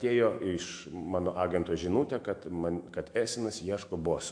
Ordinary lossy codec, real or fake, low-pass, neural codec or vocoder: AAC, 64 kbps; fake; 9.9 kHz; autoencoder, 48 kHz, 128 numbers a frame, DAC-VAE, trained on Japanese speech